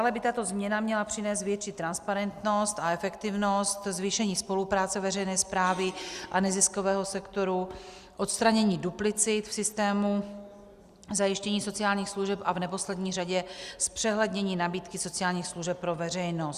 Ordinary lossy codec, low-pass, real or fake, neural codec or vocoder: Opus, 64 kbps; 14.4 kHz; real; none